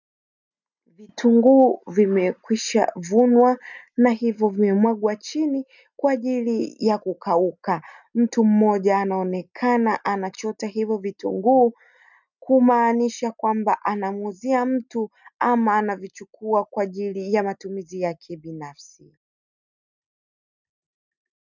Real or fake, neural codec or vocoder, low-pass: real; none; 7.2 kHz